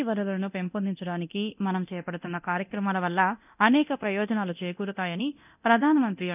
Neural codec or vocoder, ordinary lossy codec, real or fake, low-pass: codec, 24 kHz, 0.9 kbps, DualCodec; none; fake; 3.6 kHz